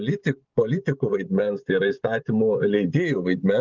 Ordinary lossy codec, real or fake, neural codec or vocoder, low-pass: Opus, 32 kbps; fake; vocoder, 24 kHz, 100 mel bands, Vocos; 7.2 kHz